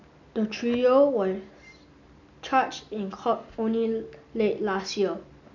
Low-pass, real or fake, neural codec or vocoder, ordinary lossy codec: 7.2 kHz; real; none; none